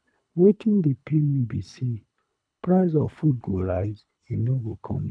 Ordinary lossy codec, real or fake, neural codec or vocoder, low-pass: none; fake; codec, 24 kHz, 3 kbps, HILCodec; 9.9 kHz